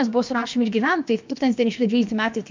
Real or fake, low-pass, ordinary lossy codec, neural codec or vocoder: fake; 7.2 kHz; MP3, 64 kbps; codec, 16 kHz, about 1 kbps, DyCAST, with the encoder's durations